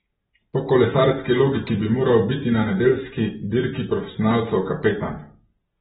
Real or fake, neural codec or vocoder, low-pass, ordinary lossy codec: real; none; 7.2 kHz; AAC, 16 kbps